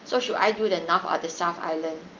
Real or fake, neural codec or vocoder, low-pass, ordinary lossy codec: real; none; 7.2 kHz; Opus, 24 kbps